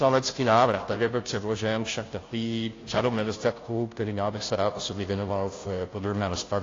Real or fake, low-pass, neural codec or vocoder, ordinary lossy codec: fake; 7.2 kHz; codec, 16 kHz, 0.5 kbps, FunCodec, trained on Chinese and English, 25 frames a second; AAC, 32 kbps